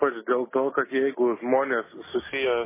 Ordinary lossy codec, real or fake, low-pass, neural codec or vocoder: MP3, 16 kbps; real; 3.6 kHz; none